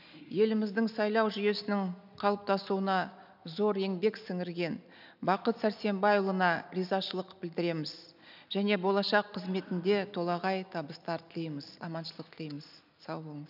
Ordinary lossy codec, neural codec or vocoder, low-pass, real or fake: none; none; 5.4 kHz; real